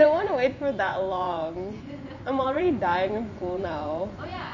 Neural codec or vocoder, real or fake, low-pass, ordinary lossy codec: vocoder, 24 kHz, 100 mel bands, Vocos; fake; 7.2 kHz; none